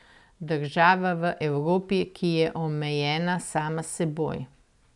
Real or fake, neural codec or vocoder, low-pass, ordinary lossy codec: real; none; 10.8 kHz; none